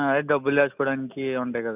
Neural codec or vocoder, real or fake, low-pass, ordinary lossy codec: none; real; 3.6 kHz; none